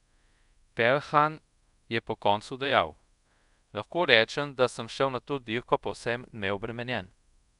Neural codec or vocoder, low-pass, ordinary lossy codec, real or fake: codec, 24 kHz, 0.5 kbps, DualCodec; 10.8 kHz; none; fake